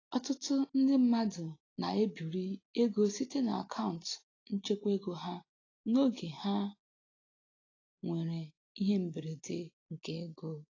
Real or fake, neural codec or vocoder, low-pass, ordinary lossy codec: real; none; 7.2 kHz; AAC, 32 kbps